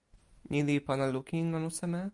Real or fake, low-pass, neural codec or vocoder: real; 10.8 kHz; none